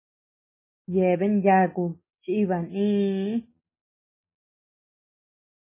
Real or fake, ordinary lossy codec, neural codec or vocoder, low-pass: real; MP3, 16 kbps; none; 3.6 kHz